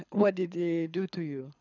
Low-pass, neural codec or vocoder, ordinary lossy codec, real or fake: 7.2 kHz; codec, 24 kHz, 6 kbps, HILCodec; none; fake